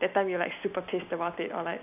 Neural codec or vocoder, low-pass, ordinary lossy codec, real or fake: none; 3.6 kHz; none; real